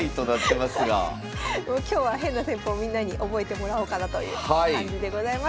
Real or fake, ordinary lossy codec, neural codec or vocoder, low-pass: real; none; none; none